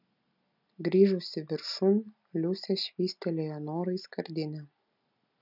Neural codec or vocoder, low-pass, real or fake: none; 5.4 kHz; real